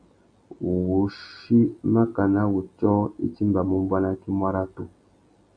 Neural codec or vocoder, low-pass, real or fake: none; 9.9 kHz; real